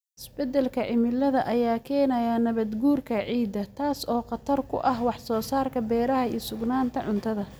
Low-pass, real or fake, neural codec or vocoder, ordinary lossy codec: none; real; none; none